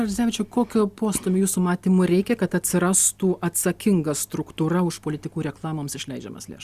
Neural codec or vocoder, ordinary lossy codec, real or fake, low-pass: none; Opus, 64 kbps; real; 14.4 kHz